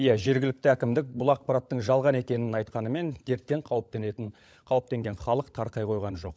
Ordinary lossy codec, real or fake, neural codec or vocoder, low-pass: none; fake; codec, 16 kHz, 16 kbps, FunCodec, trained on LibriTTS, 50 frames a second; none